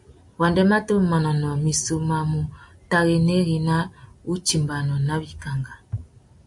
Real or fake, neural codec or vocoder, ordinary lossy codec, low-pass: real; none; AAC, 64 kbps; 10.8 kHz